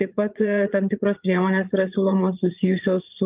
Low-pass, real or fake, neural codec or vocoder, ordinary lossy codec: 3.6 kHz; fake; vocoder, 44.1 kHz, 80 mel bands, Vocos; Opus, 32 kbps